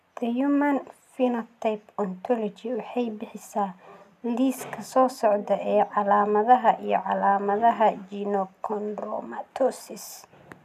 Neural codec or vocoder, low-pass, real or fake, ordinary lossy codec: none; 14.4 kHz; real; none